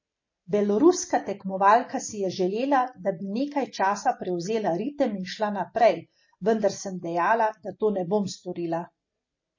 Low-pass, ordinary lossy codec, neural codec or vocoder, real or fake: 7.2 kHz; MP3, 32 kbps; none; real